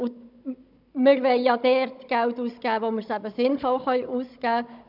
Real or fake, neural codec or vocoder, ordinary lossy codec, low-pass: fake; codec, 16 kHz, 16 kbps, FunCodec, trained on Chinese and English, 50 frames a second; none; 5.4 kHz